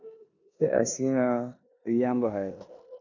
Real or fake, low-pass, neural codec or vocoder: fake; 7.2 kHz; codec, 16 kHz in and 24 kHz out, 0.9 kbps, LongCat-Audio-Codec, four codebook decoder